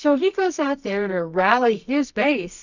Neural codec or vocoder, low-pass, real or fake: codec, 24 kHz, 0.9 kbps, WavTokenizer, medium music audio release; 7.2 kHz; fake